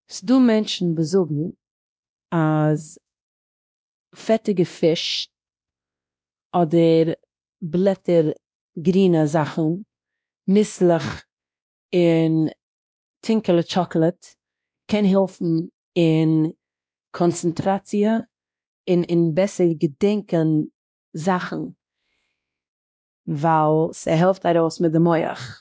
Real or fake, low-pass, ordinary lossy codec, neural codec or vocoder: fake; none; none; codec, 16 kHz, 1 kbps, X-Codec, WavLM features, trained on Multilingual LibriSpeech